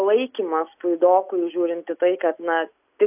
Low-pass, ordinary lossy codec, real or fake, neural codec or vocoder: 3.6 kHz; AAC, 32 kbps; real; none